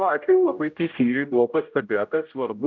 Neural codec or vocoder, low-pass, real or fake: codec, 16 kHz, 0.5 kbps, X-Codec, HuBERT features, trained on general audio; 7.2 kHz; fake